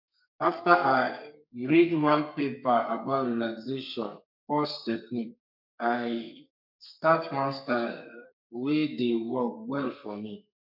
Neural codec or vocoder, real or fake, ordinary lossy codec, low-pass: codec, 32 kHz, 1.9 kbps, SNAC; fake; MP3, 48 kbps; 5.4 kHz